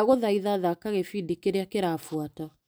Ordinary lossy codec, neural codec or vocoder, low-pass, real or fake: none; none; none; real